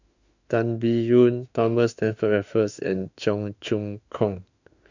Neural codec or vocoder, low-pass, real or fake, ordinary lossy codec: autoencoder, 48 kHz, 32 numbers a frame, DAC-VAE, trained on Japanese speech; 7.2 kHz; fake; none